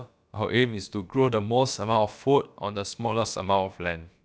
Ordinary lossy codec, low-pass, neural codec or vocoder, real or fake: none; none; codec, 16 kHz, about 1 kbps, DyCAST, with the encoder's durations; fake